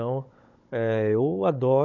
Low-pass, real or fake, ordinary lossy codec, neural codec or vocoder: 7.2 kHz; fake; none; codec, 16 kHz, 16 kbps, FunCodec, trained on Chinese and English, 50 frames a second